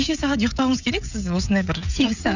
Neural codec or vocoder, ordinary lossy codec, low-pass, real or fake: codec, 16 kHz, 16 kbps, FreqCodec, smaller model; none; 7.2 kHz; fake